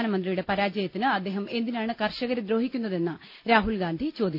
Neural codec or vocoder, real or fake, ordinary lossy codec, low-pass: none; real; none; 5.4 kHz